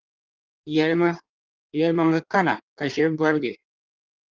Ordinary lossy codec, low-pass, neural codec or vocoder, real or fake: Opus, 24 kbps; 7.2 kHz; codec, 16 kHz in and 24 kHz out, 1.1 kbps, FireRedTTS-2 codec; fake